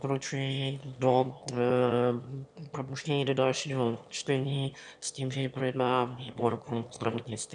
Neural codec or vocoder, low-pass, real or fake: autoencoder, 22.05 kHz, a latent of 192 numbers a frame, VITS, trained on one speaker; 9.9 kHz; fake